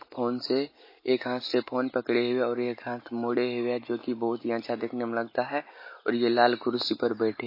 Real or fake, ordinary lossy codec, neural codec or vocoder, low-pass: real; MP3, 24 kbps; none; 5.4 kHz